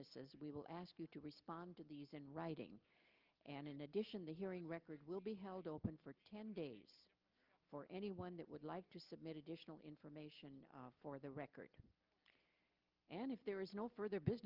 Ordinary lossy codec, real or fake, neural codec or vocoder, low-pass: Opus, 24 kbps; real; none; 5.4 kHz